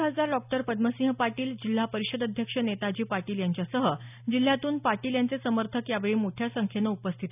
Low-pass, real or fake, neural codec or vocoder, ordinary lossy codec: 3.6 kHz; real; none; none